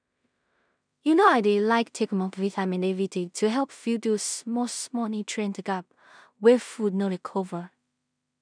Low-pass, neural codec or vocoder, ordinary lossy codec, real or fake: 9.9 kHz; codec, 16 kHz in and 24 kHz out, 0.4 kbps, LongCat-Audio-Codec, two codebook decoder; none; fake